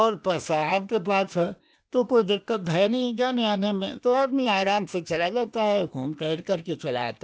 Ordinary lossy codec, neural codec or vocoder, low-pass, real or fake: none; codec, 16 kHz, 0.8 kbps, ZipCodec; none; fake